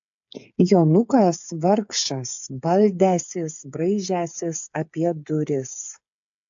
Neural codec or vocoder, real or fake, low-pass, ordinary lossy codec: codec, 16 kHz, 8 kbps, FreqCodec, smaller model; fake; 7.2 kHz; AAC, 64 kbps